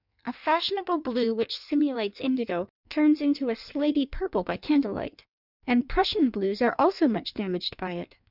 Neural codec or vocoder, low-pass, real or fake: codec, 16 kHz in and 24 kHz out, 1.1 kbps, FireRedTTS-2 codec; 5.4 kHz; fake